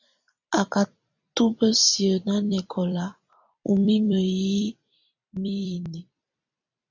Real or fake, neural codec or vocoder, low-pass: fake; vocoder, 44.1 kHz, 128 mel bands every 256 samples, BigVGAN v2; 7.2 kHz